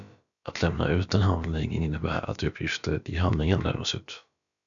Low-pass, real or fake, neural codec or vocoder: 7.2 kHz; fake; codec, 16 kHz, about 1 kbps, DyCAST, with the encoder's durations